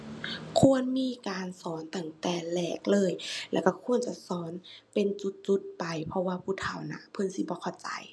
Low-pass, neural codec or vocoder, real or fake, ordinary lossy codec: none; none; real; none